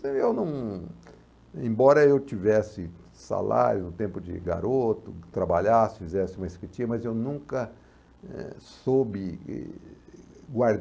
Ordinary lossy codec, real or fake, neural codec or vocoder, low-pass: none; real; none; none